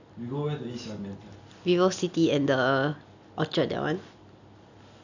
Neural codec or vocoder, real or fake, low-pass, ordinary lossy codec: none; real; 7.2 kHz; none